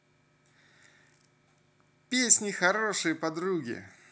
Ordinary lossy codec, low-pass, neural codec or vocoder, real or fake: none; none; none; real